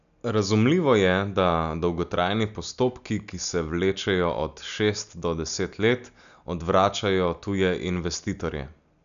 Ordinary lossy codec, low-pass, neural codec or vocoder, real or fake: MP3, 96 kbps; 7.2 kHz; none; real